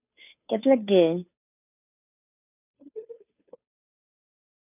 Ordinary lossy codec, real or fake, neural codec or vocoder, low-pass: none; fake; codec, 16 kHz, 2 kbps, FunCodec, trained on Chinese and English, 25 frames a second; 3.6 kHz